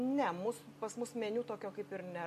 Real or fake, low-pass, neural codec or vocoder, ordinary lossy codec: real; 14.4 kHz; none; AAC, 96 kbps